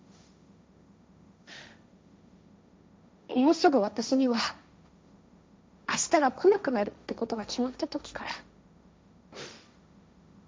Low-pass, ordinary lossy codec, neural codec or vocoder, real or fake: none; none; codec, 16 kHz, 1.1 kbps, Voila-Tokenizer; fake